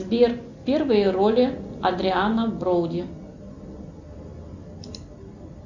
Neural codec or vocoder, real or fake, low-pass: none; real; 7.2 kHz